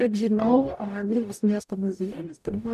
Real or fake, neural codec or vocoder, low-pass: fake; codec, 44.1 kHz, 0.9 kbps, DAC; 14.4 kHz